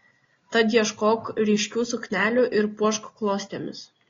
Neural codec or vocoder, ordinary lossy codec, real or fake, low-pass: none; AAC, 32 kbps; real; 7.2 kHz